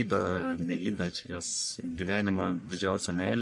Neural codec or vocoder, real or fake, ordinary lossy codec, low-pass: codec, 44.1 kHz, 1.7 kbps, Pupu-Codec; fake; MP3, 48 kbps; 10.8 kHz